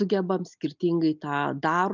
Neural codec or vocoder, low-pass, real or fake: none; 7.2 kHz; real